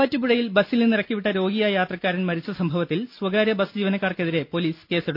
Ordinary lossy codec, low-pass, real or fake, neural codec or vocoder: AAC, 48 kbps; 5.4 kHz; real; none